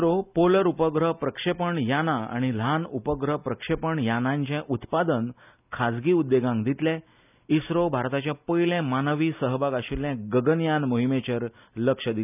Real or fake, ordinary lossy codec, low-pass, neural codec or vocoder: real; none; 3.6 kHz; none